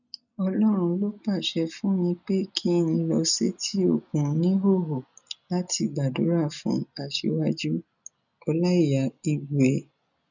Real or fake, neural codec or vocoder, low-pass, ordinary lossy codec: real; none; 7.2 kHz; none